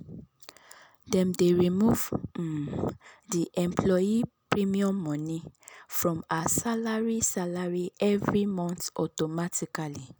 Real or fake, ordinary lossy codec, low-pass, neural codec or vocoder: real; none; none; none